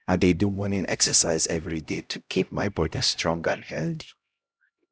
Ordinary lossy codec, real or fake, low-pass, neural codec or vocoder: none; fake; none; codec, 16 kHz, 0.5 kbps, X-Codec, HuBERT features, trained on LibriSpeech